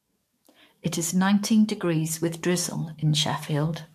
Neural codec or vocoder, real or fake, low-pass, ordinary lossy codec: codec, 44.1 kHz, 7.8 kbps, DAC; fake; 14.4 kHz; MP3, 64 kbps